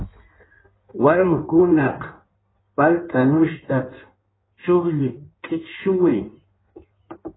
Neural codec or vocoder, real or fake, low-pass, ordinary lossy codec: codec, 16 kHz in and 24 kHz out, 1.1 kbps, FireRedTTS-2 codec; fake; 7.2 kHz; AAC, 16 kbps